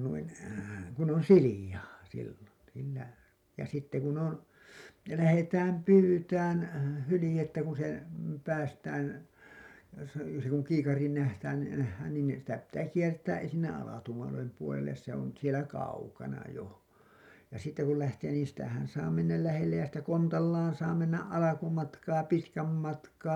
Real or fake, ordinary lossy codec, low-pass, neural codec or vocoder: real; none; 19.8 kHz; none